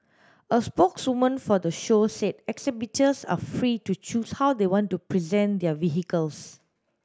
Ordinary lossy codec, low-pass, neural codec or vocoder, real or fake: none; none; none; real